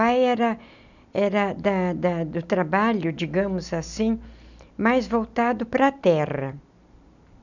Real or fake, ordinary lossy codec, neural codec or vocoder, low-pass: real; none; none; 7.2 kHz